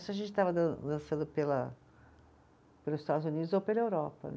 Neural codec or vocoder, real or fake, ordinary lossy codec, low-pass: none; real; none; none